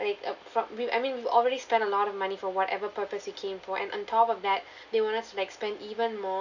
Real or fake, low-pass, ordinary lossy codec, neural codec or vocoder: real; 7.2 kHz; none; none